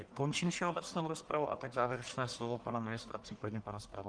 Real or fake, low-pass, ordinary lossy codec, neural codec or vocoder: fake; 9.9 kHz; Opus, 24 kbps; codec, 44.1 kHz, 1.7 kbps, Pupu-Codec